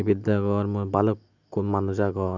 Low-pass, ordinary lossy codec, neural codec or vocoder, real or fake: 7.2 kHz; none; none; real